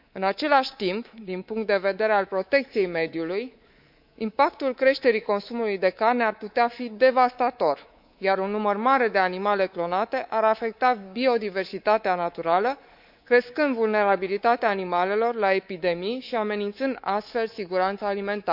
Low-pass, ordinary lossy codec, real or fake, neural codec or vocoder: 5.4 kHz; none; fake; codec, 24 kHz, 3.1 kbps, DualCodec